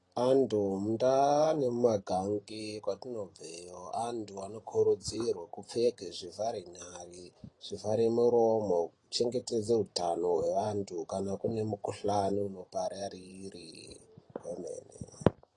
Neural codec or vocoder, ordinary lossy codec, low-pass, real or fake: vocoder, 44.1 kHz, 128 mel bands every 512 samples, BigVGAN v2; AAC, 32 kbps; 10.8 kHz; fake